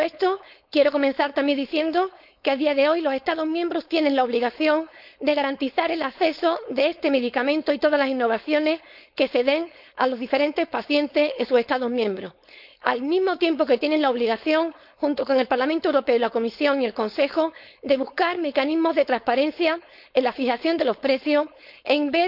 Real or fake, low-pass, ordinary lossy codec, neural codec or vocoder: fake; 5.4 kHz; none; codec, 16 kHz, 4.8 kbps, FACodec